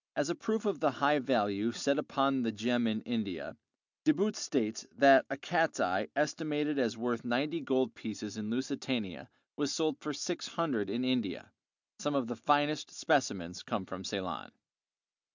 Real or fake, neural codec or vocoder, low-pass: real; none; 7.2 kHz